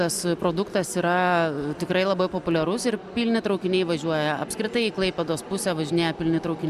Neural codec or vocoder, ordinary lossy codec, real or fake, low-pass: none; Opus, 64 kbps; real; 14.4 kHz